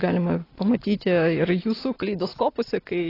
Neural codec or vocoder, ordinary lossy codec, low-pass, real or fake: none; AAC, 24 kbps; 5.4 kHz; real